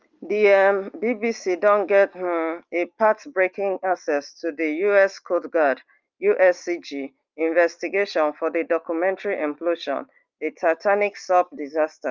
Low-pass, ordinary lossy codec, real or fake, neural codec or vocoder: 7.2 kHz; Opus, 24 kbps; real; none